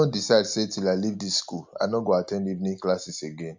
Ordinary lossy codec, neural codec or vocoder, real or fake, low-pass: MP3, 64 kbps; none; real; 7.2 kHz